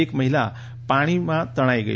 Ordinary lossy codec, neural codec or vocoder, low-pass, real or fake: none; none; none; real